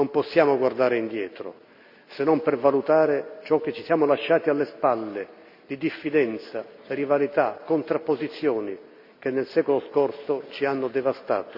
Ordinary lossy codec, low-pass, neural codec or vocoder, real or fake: none; 5.4 kHz; none; real